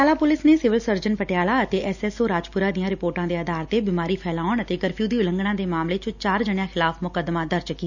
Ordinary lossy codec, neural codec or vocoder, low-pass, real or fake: none; none; 7.2 kHz; real